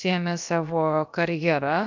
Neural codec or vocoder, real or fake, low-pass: codec, 16 kHz, about 1 kbps, DyCAST, with the encoder's durations; fake; 7.2 kHz